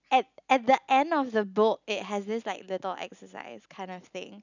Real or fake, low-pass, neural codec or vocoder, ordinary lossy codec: real; 7.2 kHz; none; none